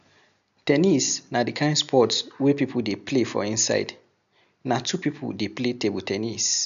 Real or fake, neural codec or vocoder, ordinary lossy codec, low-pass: real; none; none; 7.2 kHz